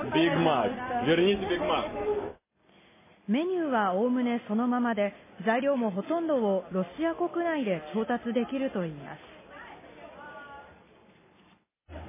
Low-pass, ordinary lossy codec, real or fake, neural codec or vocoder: 3.6 kHz; MP3, 16 kbps; real; none